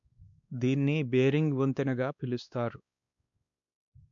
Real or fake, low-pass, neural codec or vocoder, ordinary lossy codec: fake; 7.2 kHz; codec, 16 kHz, 2 kbps, X-Codec, WavLM features, trained on Multilingual LibriSpeech; none